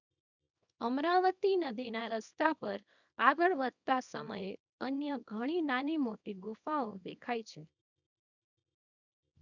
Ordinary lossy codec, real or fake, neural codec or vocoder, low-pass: none; fake; codec, 24 kHz, 0.9 kbps, WavTokenizer, small release; 7.2 kHz